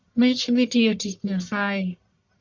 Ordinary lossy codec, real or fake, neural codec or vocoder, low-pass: MP3, 64 kbps; fake; codec, 44.1 kHz, 1.7 kbps, Pupu-Codec; 7.2 kHz